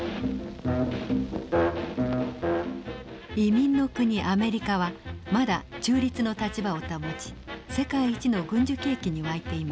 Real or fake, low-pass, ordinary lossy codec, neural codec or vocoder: real; none; none; none